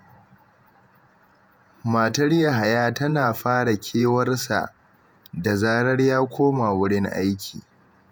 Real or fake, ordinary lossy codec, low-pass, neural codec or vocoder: fake; none; none; vocoder, 48 kHz, 128 mel bands, Vocos